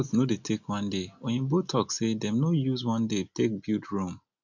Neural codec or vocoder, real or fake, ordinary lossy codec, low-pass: none; real; none; 7.2 kHz